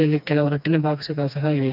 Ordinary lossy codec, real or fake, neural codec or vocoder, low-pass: none; fake; codec, 16 kHz, 2 kbps, FreqCodec, smaller model; 5.4 kHz